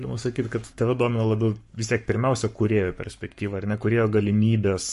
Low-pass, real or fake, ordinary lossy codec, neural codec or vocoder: 14.4 kHz; fake; MP3, 48 kbps; codec, 44.1 kHz, 7.8 kbps, Pupu-Codec